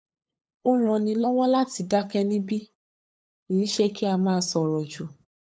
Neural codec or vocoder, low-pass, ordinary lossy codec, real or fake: codec, 16 kHz, 8 kbps, FunCodec, trained on LibriTTS, 25 frames a second; none; none; fake